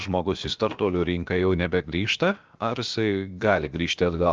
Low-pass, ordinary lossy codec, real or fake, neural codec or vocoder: 7.2 kHz; Opus, 32 kbps; fake; codec, 16 kHz, about 1 kbps, DyCAST, with the encoder's durations